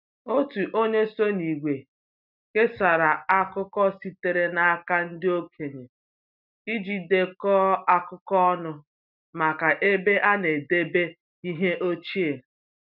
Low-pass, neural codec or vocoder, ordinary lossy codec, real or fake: 5.4 kHz; none; none; real